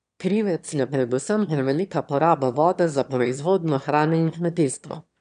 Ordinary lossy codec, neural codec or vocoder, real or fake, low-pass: none; autoencoder, 22.05 kHz, a latent of 192 numbers a frame, VITS, trained on one speaker; fake; 9.9 kHz